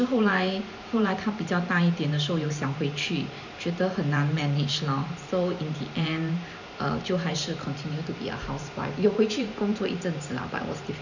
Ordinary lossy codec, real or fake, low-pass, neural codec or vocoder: none; fake; 7.2 kHz; vocoder, 44.1 kHz, 128 mel bands every 512 samples, BigVGAN v2